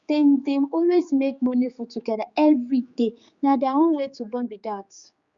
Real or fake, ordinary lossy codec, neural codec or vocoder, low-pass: fake; Opus, 64 kbps; codec, 16 kHz, 4 kbps, X-Codec, HuBERT features, trained on general audio; 7.2 kHz